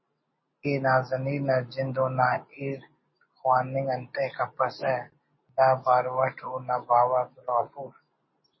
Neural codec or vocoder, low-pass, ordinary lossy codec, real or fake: none; 7.2 kHz; MP3, 24 kbps; real